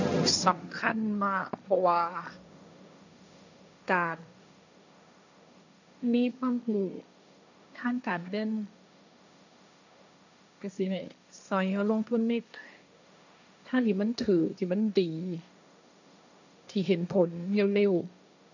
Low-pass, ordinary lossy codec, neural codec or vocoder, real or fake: 7.2 kHz; none; codec, 16 kHz, 1.1 kbps, Voila-Tokenizer; fake